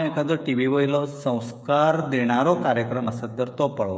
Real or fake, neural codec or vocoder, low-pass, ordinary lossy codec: fake; codec, 16 kHz, 8 kbps, FreqCodec, smaller model; none; none